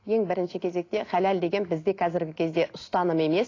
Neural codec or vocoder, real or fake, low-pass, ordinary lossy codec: none; real; 7.2 kHz; AAC, 32 kbps